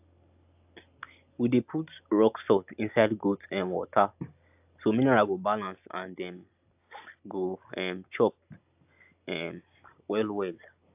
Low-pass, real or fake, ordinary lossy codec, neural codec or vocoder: 3.6 kHz; real; AAC, 32 kbps; none